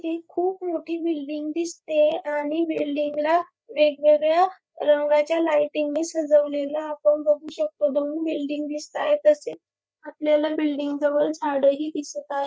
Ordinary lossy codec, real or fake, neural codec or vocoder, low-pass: none; fake; codec, 16 kHz, 4 kbps, FreqCodec, larger model; none